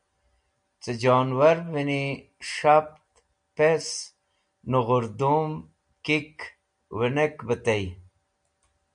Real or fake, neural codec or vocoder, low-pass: real; none; 9.9 kHz